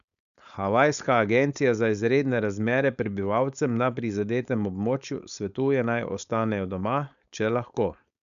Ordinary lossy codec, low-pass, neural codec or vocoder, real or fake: none; 7.2 kHz; codec, 16 kHz, 4.8 kbps, FACodec; fake